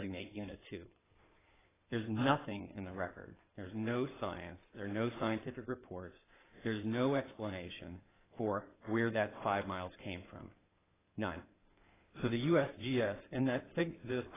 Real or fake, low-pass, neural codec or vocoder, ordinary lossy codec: fake; 3.6 kHz; codec, 16 kHz in and 24 kHz out, 2.2 kbps, FireRedTTS-2 codec; AAC, 16 kbps